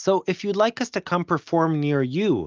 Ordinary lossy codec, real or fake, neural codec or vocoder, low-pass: Opus, 24 kbps; real; none; 7.2 kHz